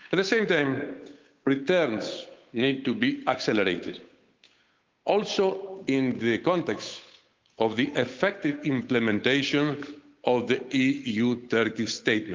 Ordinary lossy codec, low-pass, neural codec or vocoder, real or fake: Opus, 24 kbps; 7.2 kHz; codec, 16 kHz, 8 kbps, FunCodec, trained on Chinese and English, 25 frames a second; fake